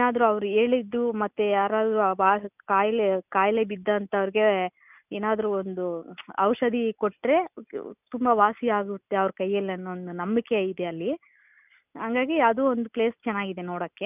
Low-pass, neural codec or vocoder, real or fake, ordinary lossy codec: 3.6 kHz; none; real; none